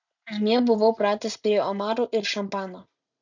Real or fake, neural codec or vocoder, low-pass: real; none; 7.2 kHz